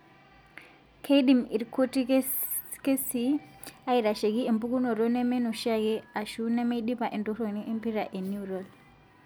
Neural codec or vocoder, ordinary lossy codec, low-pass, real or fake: none; none; none; real